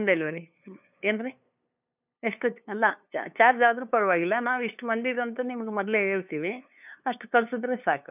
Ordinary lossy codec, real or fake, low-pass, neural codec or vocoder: none; fake; 3.6 kHz; codec, 16 kHz, 2 kbps, FunCodec, trained on LibriTTS, 25 frames a second